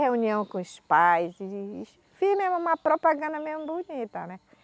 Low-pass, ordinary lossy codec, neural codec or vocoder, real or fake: none; none; none; real